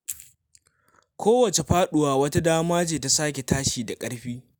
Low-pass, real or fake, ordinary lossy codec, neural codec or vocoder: none; real; none; none